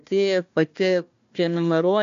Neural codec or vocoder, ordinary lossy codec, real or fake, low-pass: codec, 16 kHz, 1 kbps, FunCodec, trained on Chinese and English, 50 frames a second; AAC, 64 kbps; fake; 7.2 kHz